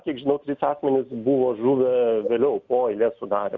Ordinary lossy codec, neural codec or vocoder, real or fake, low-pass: Opus, 64 kbps; none; real; 7.2 kHz